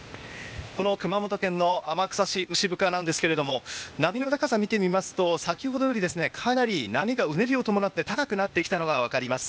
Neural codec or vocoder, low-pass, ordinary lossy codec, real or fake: codec, 16 kHz, 0.8 kbps, ZipCodec; none; none; fake